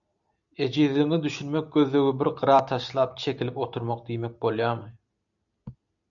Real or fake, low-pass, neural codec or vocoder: real; 7.2 kHz; none